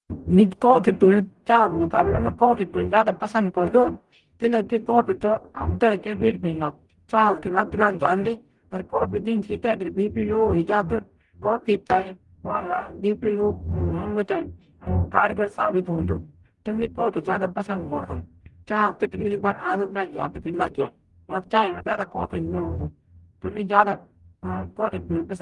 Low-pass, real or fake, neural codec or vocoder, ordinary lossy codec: 10.8 kHz; fake; codec, 44.1 kHz, 0.9 kbps, DAC; Opus, 24 kbps